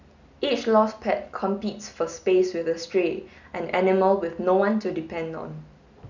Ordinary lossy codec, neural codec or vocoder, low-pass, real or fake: none; none; 7.2 kHz; real